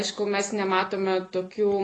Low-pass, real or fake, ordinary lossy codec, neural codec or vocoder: 10.8 kHz; real; AAC, 32 kbps; none